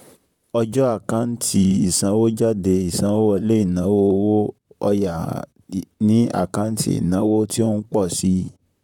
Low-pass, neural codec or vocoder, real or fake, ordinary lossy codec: 19.8 kHz; vocoder, 44.1 kHz, 128 mel bands, Pupu-Vocoder; fake; none